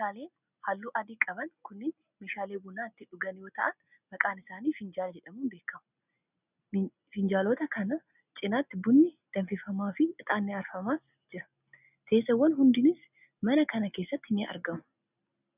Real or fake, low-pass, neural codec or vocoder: real; 3.6 kHz; none